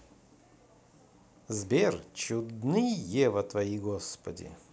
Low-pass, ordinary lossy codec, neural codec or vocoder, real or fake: none; none; none; real